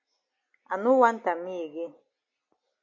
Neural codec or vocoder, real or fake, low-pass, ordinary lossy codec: none; real; 7.2 kHz; AAC, 48 kbps